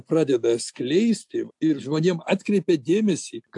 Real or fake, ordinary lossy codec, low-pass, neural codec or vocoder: real; AAC, 64 kbps; 10.8 kHz; none